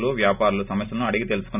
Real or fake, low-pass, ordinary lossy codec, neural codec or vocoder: real; 3.6 kHz; none; none